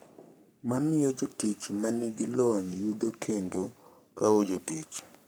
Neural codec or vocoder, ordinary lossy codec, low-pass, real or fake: codec, 44.1 kHz, 3.4 kbps, Pupu-Codec; none; none; fake